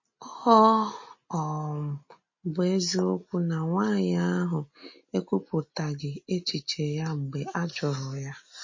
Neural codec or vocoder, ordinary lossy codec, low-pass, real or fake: none; MP3, 32 kbps; 7.2 kHz; real